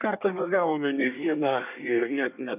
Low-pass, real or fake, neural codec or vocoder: 3.6 kHz; fake; codec, 24 kHz, 1 kbps, SNAC